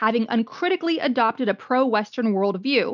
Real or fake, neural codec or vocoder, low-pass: real; none; 7.2 kHz